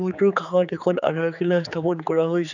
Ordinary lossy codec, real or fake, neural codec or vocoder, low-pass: none; fake; codec, 16 kHz, 4 kbps, X-Codec, HuBERT features, trained on general audio; 7.2 kHz